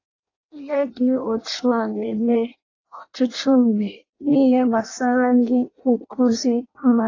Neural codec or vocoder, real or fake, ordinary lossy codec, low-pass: codec, 16 kHz in and 24 kHz out, 0.6 kbps, FireRedTTS-2 codec; fake; AAC, 32 kbps; 7.2 kHz